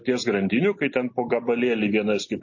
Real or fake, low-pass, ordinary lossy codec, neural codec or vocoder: real; 7.2 kHz; MP3, 32 kbps; none